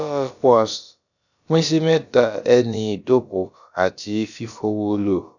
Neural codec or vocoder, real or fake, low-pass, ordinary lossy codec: codec, 16 kHz, about 1 kbps, DyCAST, with the encoder's durations; fake; 7.2 kHz; none